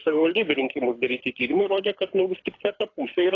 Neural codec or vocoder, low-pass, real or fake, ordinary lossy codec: codec, 24 kHz, 6 kbps, HILCodec; 7.2 kHz; fake; AAC, 32 kbps